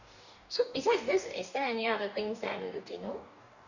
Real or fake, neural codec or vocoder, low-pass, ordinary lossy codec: fake; codec, 44.1 kHz, 2.6 kbps, DAC; 7.2 kHz; none